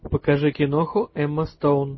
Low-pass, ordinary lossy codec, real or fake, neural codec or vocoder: 7.2 kHz; MP3, 24 kbps; real; none